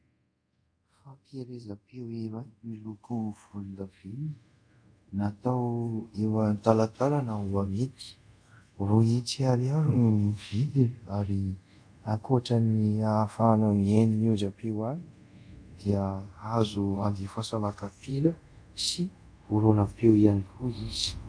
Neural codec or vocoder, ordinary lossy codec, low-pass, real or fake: codec, 24 kHz, 0.5 kbps, DualCodec; MP3, 96 kbps; 9.9 kHz; fake